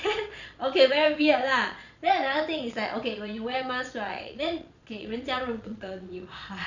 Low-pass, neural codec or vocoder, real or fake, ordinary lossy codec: 7.2 kHz; vocoder, 22.05 kHz, 80 mel bands, Vocos; fake; none